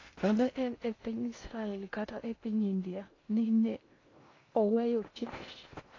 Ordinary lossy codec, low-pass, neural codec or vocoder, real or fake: AAC, 32 kbps; 7.2 kHz; codec, 16 kHz in and 24 kHz out, 0.6 kbps, FocalCodec, streaming, 2048 codes; fake